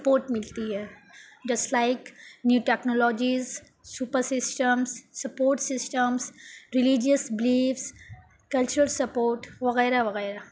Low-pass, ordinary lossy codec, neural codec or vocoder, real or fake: none; none; none; real